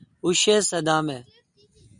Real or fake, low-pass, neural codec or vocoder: real; 9.9 kHz; none